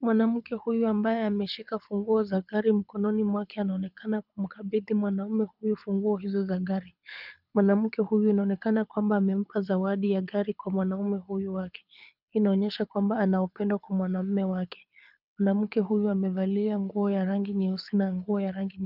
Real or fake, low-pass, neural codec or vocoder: fake; 5.4 kHz; codec, 24 kHz, 6 kbps, HILCodec